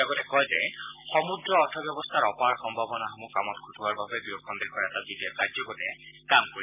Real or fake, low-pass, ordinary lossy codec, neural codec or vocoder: real; 3.6 kHz; none; none